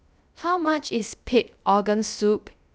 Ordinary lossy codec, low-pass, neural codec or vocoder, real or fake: none; none; codec, 16 kHz, 0.3 kbps, FocalCodec; fake